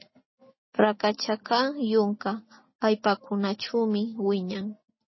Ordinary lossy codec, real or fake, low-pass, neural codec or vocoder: MP3, 24 kbps; real; 7.2 kHz; none